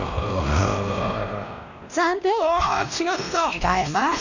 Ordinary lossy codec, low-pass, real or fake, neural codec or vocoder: none; 7.2 kHz; fake; codec, 16 kHz, 1 kbps, X-Codec, WavLM features, trained on Multilingual LibriSpeech